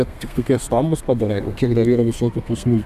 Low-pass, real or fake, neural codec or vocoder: 14.4 kHz; fake; codec, 32 kHz, 1.9 kbps, SNAC